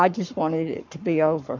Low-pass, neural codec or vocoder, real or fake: 7.2 kHz; codec, 44.1 kHz, 7.8 kbps, Pupu-Codec; fake